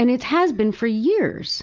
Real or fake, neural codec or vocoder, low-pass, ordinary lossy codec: real; none; 7.2 kHz; Opus, 32 kbps